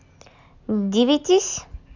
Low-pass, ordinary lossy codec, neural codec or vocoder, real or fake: 7.2 kHz; none; none; real